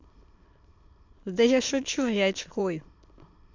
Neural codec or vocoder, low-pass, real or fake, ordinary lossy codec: autoencoder, 22.05 kHz, a latent of 192 numbers a frame, VITS, trained on many speakers; 7.2 kHz; fake; MP3, 64 kbps